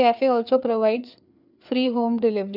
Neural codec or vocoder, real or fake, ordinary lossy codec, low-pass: codec, 16 kHz, 4 kbps, FreqCodec, larger model; fake; none; 5.4 kHz